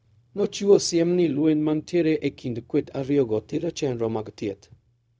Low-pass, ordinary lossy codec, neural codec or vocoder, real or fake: none; none; codec, 16 kHz, 0.4 kbps, LongCat-Audio-Codec; fake